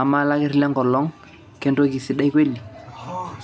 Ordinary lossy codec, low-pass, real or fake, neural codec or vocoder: none; none; real; none